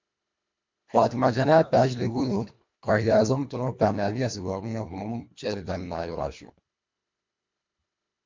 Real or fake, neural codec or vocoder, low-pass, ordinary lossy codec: fake; codec, 24 kHz, 1.5 kbps, HILCodec; 7.2 kHz; MP3, 64 kbps